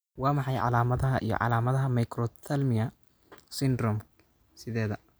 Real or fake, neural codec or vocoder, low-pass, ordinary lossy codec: real; none; none; none